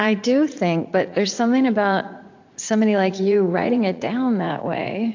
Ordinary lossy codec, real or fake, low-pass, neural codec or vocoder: AAC, 48 kbps; fake; 7.2 kHz; vocoder, 44.1 kHz, 80 mel bands, Vocos